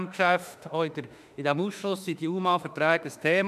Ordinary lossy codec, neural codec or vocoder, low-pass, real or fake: none; autoencoder, 48 kHz, 32 numbers a frame, DAC-VAE, trained on Japanese speech; 14.4 kHz; fake